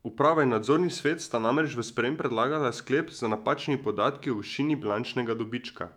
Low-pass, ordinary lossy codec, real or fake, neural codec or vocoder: 19.8 kHz; none; fake; autoencoder, 48 kHz, 128 numbers a frame, DAC-VAE, trained on Japanese speech